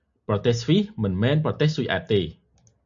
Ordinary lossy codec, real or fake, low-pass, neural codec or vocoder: AAC, 64 kbps; real; 7.2 kHz; none